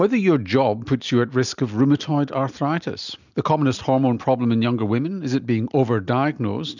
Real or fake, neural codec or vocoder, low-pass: real; none; 7.2 kHz